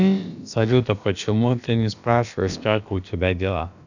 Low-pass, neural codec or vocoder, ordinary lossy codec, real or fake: 7.2 kHz; codec, 16 kHz, about 1 kbps, DyCAST, with the encoder's durations; none; fake